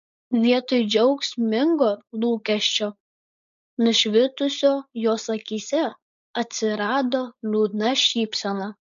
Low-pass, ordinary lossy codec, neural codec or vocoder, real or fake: 7.2 kHz; MP3, 48 kbps; codec, 16 kHz, 4.8 kbps, FACodec; fake